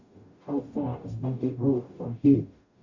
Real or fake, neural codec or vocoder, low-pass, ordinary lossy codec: fake; codec, 44.1 kHz, 0.9 kbps, DAC; 7.2 kHz; none